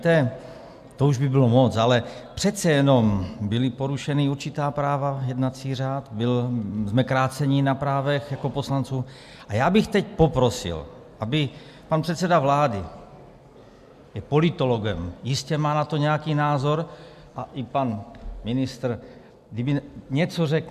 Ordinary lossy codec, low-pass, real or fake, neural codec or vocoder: MP3, 96 kbps; 14.4 kHz; real; none